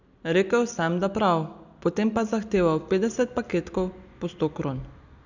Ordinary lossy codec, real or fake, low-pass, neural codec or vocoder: none; real; 7.2 kHz; none